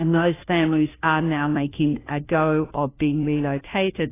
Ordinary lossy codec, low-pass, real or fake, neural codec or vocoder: AAC, 16 kbps; 3.6 kHz; fake; codec, 16 kHz, 1 kbps, FunCodec, trained on LibriTTS, 50 frames a second